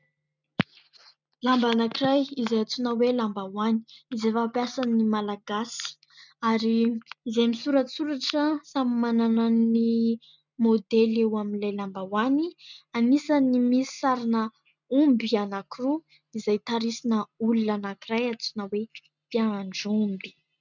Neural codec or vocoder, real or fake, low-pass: none; real; 7.2 kHz